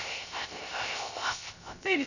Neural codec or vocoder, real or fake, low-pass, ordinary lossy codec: codec, 16 kHz, 0.3 kbps, FocalCodec; fake; 7.2 kHz; none